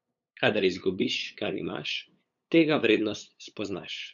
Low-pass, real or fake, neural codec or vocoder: 7.2 kHz; fake; codec, 16 kHz, 8 kbps, FunCodec, trained on LibriTTS, 25 frames a second